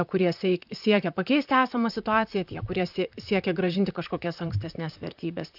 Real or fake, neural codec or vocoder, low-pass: fake; vocoder, 44.1 kHz, 128 mel bands, Pupu-Vocoder; 5.4 kHz